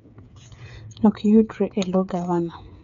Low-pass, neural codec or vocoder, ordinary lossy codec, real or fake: 7.2 kHz; codec, 16 kHz, 8 kbps, FreqCodec, smaller model; none; fake